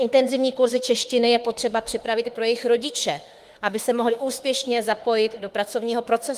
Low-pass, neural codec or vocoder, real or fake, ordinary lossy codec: 14.4 kHz; autoencoder, 48 kHz, 32 numbers a frame, DAC-VAE, trained on Japanese speech; fake; Opus, 24 kbps